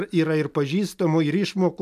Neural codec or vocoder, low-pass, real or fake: none; 14.4 kHz; real